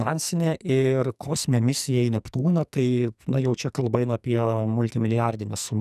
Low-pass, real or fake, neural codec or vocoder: 14.4 kHz; fake; codec, 44.1 kHz, 2.6 kbps, SNAC